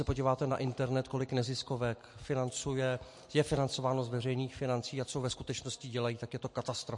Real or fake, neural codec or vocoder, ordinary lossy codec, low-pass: real; none; MP3, 48 kbps; 10.8 kHz